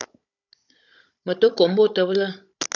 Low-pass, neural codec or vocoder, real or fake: 7.2 kHz; codec, 16 kHz, 16 kbps, FunCodec, trained on Chinese and English, 50 frames a second; fake